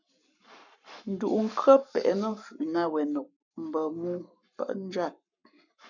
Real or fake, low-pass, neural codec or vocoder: fake; 7.2 kHz; codec, 44.1 kHz, 7.8 kbps, Pupu-Codec